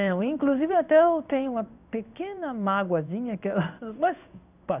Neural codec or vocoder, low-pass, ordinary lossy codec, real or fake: codec, 16 kHz in and 24 kHz out, 1 kbps, XY-Tokenizer; 3.6 kHz; none; fake